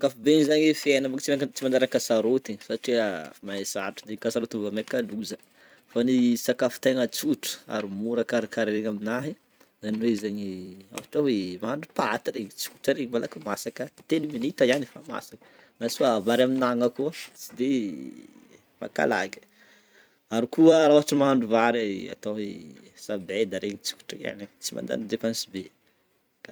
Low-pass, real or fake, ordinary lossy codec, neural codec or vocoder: none; fake; none; vocoder, 44.1 kHz, 128 mel bands every 256 samples, BigVGAN v2